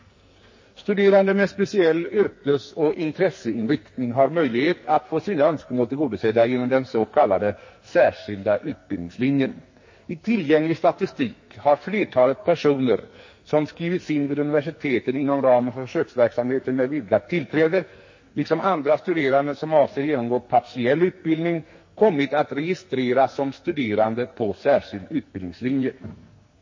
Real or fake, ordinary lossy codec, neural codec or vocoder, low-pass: fake; MP3, 32 kbps; codec, 44.1 kHz, 2.6 kbps, SNAC; 7.2 kHz